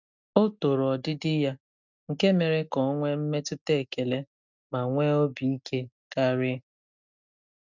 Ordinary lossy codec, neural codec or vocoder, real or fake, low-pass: none; none; real; 7.2 kHz